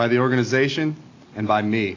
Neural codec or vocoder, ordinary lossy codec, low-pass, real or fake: none; AAC, 32 kbps; 7.2 kHz; real